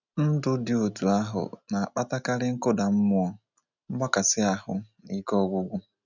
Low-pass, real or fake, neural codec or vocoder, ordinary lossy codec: 7.2 kHz; real; none; none